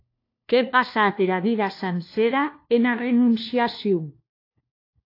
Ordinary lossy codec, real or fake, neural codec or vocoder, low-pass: AAC, 32 kbps; fake; codec, 16 kHz, 1 kbps, FunCodec, trained on LibriTTS, 50 frames a second; 5.4 kHz